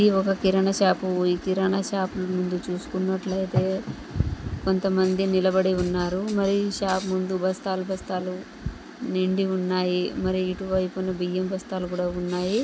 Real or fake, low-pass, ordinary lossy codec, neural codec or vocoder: real; none; none; none